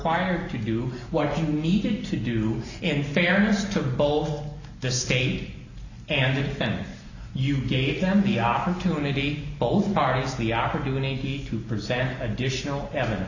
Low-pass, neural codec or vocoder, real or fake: 7.2 kHz; none; real